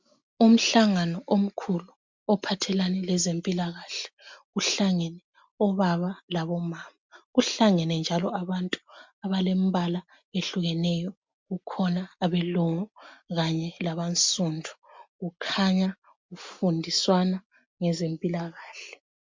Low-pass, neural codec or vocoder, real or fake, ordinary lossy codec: 7.2 kHz; none; real; MP3, 64 kbps